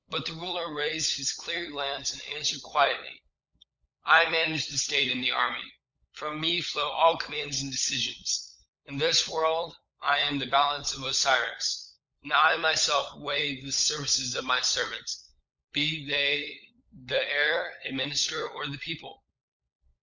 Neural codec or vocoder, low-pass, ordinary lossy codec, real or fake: codec, 16 kHz, 16 kbps, FunCodec, trained on LibriTTS, 50 frames a second; 7.2 kHz; Opus, 64 kbps; fake